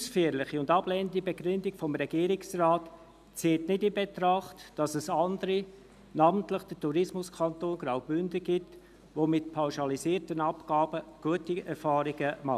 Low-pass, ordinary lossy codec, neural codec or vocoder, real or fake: 14.4 kHz; none; none; real